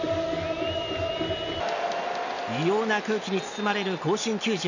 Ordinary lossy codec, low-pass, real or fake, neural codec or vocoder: none; 7.2 kHz; real; none